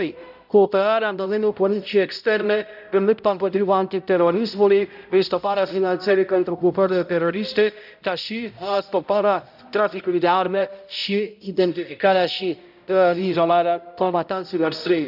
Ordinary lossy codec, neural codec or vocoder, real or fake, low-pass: none; codec, 16 kHz, 0.5 kbps, X-Codec, HuBERT features, trained on balanced general audio; fake; 5.4 kHz